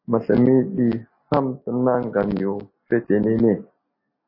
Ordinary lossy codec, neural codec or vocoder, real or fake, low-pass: MP3, 24 kbps; none; real; 5.4 kHz